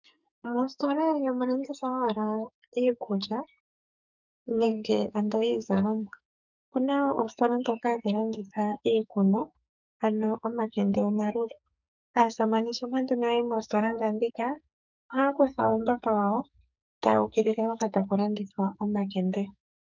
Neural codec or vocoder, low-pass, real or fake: codec, 44.1 kHz, 2.6 kbps, SNAC; 7.2 kHz; fake